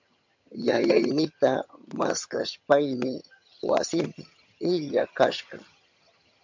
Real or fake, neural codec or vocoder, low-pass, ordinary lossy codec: fake; vocoder, 22.05 kHz, 80 mel bands, HiFi-GAN; 7.2 kHz; MP3, 48 kbps